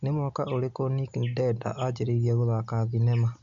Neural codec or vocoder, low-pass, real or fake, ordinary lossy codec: none; 7.2 kHz; real; MP3, 64 kbps